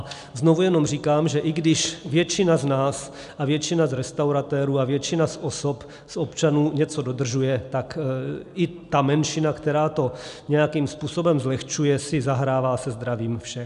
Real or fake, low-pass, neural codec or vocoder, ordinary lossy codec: real; 10.8 kHz; none; AAC, 96 kbps